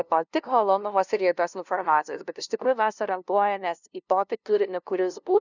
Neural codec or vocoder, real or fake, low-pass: codec, 16 kHz, 0.5 kbps, FunCodec, trained on LibriTTS, 25 frames a second; fake; 7.2 kHz